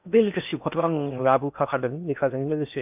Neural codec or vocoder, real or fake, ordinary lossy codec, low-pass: codec, 16 kHz in and 24 kHz out, 0.6 kbps, FocalCodec, streaming, 4096 codes; fake; none; 3.6 kHz